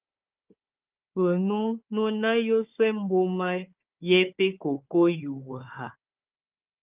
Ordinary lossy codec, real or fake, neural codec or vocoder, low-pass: Opus, 32 kbps; fake; codec, 16 kHz, 4 kbps, FunCodec, trained on Chinese and English, 50 frames a second; 3.6 kHz